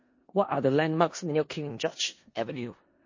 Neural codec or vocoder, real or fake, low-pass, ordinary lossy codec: codec, 16 kHz in and 24 kHz out, 0.4 kbps, LongCat-Audio-Codec, four codebook decoder; fake; 7.2 kHz; MP3, 32 kbps